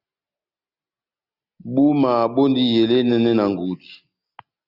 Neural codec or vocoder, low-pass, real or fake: none; 5.4 kHz; real